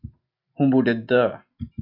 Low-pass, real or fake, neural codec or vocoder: 5.4 kHz; real; none